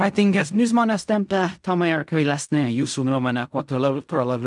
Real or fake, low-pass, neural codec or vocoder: fake; 10.8 kHz; codec, 16 kHz in and 24 kHz out, 0.4 kbps, LongCat-Audio-Codec, fine tuned four codebook decoder